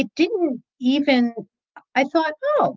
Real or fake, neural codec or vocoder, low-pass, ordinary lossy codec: real; none; 7.2 kHz; Opus, 32 kbps